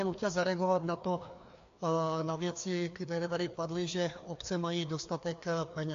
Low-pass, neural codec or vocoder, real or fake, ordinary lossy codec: 7.2 kHz; codec, 16 kHz, 2 kbps, FreqCodec, larger model; fake; AAC, 48 kbps